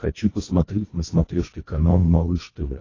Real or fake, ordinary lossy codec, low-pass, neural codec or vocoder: fake; AAC, 32 kbps; 7.2 kHz; codec, 24 kHz, 1.5 kbps, HILCodec